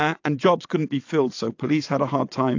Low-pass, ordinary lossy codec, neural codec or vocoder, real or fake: 7.2 kHz; AAC, 48 kbps; vocoder, 22.05 kHz, 80 mel bands, WaveNeXt; fake